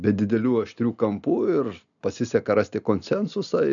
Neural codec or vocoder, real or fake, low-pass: none; real; 7.2 kHz